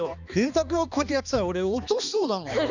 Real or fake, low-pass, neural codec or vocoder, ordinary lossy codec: fake; 7.2 kHz; codec, 16 kHz, 2 kbps, X-Codec, HuBERT features, trained on balanced general audio; none